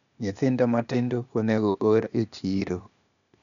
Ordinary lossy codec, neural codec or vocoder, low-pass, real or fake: none; codec, 16 kHz, 0.8 kbps, ZipCodec; 7.2 kHz; fake